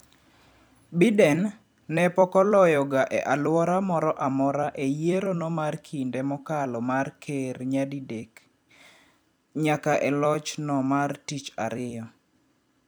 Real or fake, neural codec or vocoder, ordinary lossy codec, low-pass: fake; vocoder, 44.1 kHz, 128 mel bands every 256 samples, BigVGAN v2; none; none